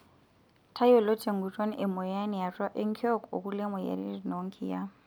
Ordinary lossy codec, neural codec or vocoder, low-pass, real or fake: none; none; none; real